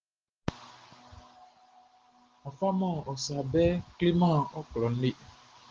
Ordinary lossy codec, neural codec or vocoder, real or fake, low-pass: Opus, 16 kbps; none; real; 7.2 kHz